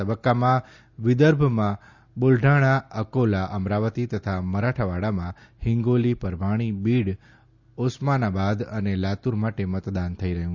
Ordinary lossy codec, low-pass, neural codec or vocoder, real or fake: Opus, 64 kbps; 7.2 kHz; none; real